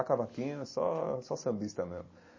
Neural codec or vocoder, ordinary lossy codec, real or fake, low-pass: none; MP3, 32 kbps; real; 7.2 kHz